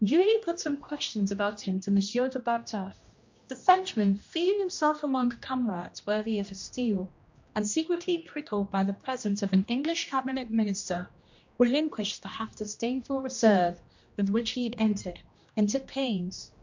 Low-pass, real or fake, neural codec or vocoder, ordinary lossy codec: 7.2 kHz; fake; codec, 16 kHz, 1 kbps, X-Codec, HuBERT features, trained on general audio; MP3, 48 kbps